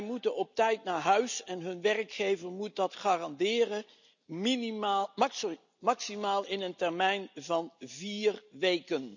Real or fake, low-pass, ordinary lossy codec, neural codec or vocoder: real; 7.2 kHz; none; none